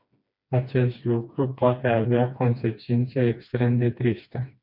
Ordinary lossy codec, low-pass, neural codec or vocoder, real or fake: MP3, 48 kbps; 5.4 kHz; codec, 16 kHz, 2 kbps, FreqCodec, smaller model; fake